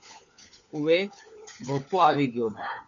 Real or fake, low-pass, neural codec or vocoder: fake; 7.2 kHz; codec, 16 kHz, 4 kbps, FunCodec, trained on LibriTTS, 50 frames a second